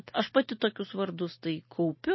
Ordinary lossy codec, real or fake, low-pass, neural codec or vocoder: MP3, 24 kbps; real; 7.2 kHz; none